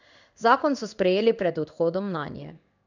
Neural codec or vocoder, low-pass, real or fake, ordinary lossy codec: codec, 16 kHz in and 24 kHz out, 1 kbps, XY-Tokenizer; 7.2 kHz; fake; none